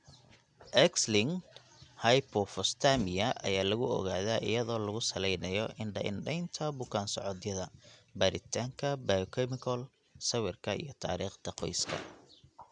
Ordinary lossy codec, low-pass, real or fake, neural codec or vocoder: none; 9.9 kHz; real; none